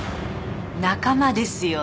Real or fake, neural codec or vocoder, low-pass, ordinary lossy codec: real; none; none; none